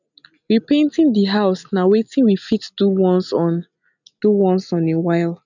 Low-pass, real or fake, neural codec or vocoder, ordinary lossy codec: 7.2 kHz; real; none; none